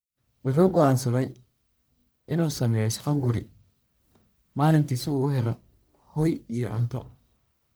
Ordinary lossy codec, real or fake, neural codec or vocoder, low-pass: none; fake; codec, 44.1 kHz, 1.7 kbps, Pupu-Codec; none